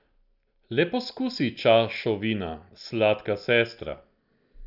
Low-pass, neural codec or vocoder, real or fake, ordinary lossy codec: 5.4 kHz; none; real; none